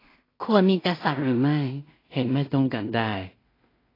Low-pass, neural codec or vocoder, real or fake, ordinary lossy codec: 5.4 kHz; codec, 16 kHz in and 24 kHz out, 0.4 kbps, LongCat-Audio-Codec, two codebook decoder; fake; AAC, 24 kbps